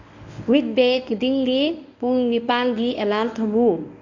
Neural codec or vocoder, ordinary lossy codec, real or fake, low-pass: codec, 24 kHz, 0.9 kbps, WavTokenizer, medium speech release version 1; none; fake; 7.2 kHz